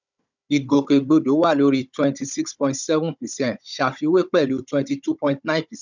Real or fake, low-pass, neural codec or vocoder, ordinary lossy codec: fake; 7.2 kHz; codec, 16 kHz, 16 kbps, FunCodec, trained on Chinese and English, 50 frames a second; none